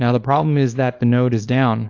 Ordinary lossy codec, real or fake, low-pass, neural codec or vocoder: AAC, 48 kbps; fake; 7.2 kHz; codec, 24 kHz, 0.9 kbps, WavTokenizer, small release